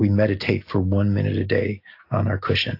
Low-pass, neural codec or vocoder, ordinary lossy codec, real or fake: 5.4 kHz; none; AAC, 32 kbps; real